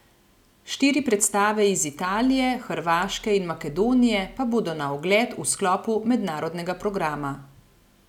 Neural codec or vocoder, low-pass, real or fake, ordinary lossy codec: none; 19.8 kHz; real; none